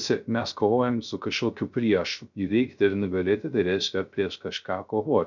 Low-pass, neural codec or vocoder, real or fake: 7.2 kHz; codec, 16 kHz, 0.3 kbps, FocalCodec; fake